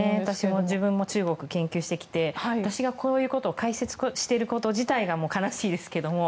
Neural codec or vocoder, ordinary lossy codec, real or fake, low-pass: none; none; real; none